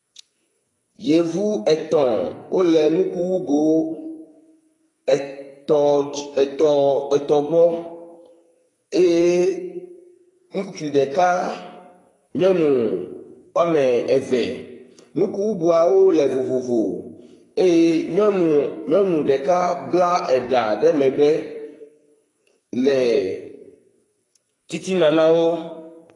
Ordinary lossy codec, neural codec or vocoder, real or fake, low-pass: AAC, 32 kbps; codec, 44.1 kHz, 2.6 kbps, SNAC; fake; 10.8 kHz